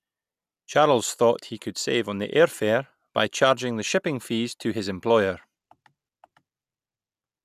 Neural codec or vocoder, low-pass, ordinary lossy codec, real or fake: none; 14.4 kHz; none; real